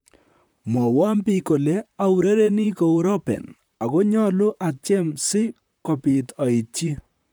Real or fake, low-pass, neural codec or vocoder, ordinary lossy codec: fake; none; vocoder, 44.1 kHz, 128 mel bands, Pupu-Vocoder; none